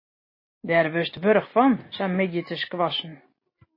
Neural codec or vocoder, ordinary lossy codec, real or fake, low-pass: none; MP3, 24 kbps; real; 5.4 kHz